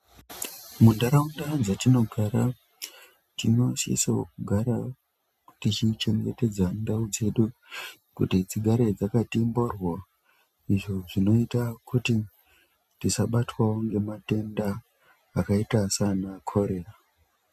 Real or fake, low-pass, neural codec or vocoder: real; 14.4 kHz; none